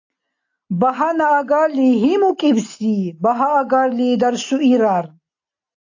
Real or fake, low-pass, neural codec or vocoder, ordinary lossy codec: real; 7.2 kHz; none; AAC, 48 kbps